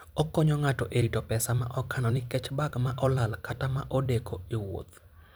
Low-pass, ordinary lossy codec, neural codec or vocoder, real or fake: none; none; vocoder, 44.1 kHz, 128 mel bands every 256 samples, BigVGAN v2; fake